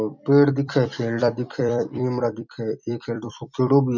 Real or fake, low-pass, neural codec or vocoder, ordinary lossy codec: fake; 7.2 kHz; vocoder, 44.1 kHz, 128 mel bands every 256 samples, BigVGAN v2; none